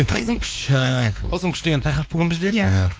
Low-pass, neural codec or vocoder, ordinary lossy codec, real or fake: none; codec, 16 kHz, 2 kbps, X-Codec, WavLM features, trained on Multilingual LibriSpeech; none; fake